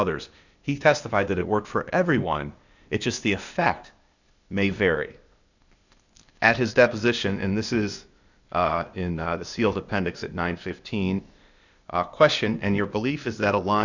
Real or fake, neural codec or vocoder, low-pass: fake; codec, 16 kHz, 0.8 kbps, ZipCodec; 7.2 kHz